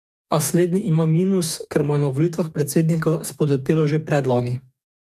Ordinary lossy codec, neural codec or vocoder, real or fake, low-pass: none; codec, 44.1 kHz, 2.6 kbps, DAC; fake; 14.4 kHz